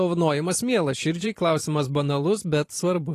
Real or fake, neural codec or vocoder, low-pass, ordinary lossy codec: fake; vocoder, 44.1 kHz, 128 mel bands every 512 samples, BigVGAN v2; 14.4 kHz; AAC, 48 kbps